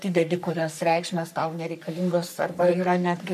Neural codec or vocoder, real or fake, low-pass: codec, 44.1 kHz, 3.4 kbps, Pupu-Codec; fake; 14.4 kHz